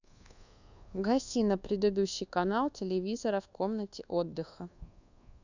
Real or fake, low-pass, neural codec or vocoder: fake; 7.2 kHz; codec, 24 kHz, 1.2 kbps, DualCodec